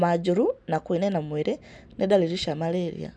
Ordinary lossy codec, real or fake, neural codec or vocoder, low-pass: none; real; none; none